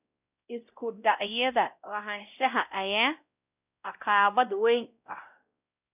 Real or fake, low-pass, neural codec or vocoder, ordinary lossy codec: fake; 3.6 kHz; codec, 16 kHz, 0.5 kbps, X-Codec, WavLM features, trained on Multilingual LibriSpeech; none